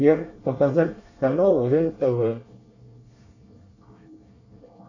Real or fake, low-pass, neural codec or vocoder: fake; 7.2 kHz; codec, 24 kHz, 1 kbps, SNAC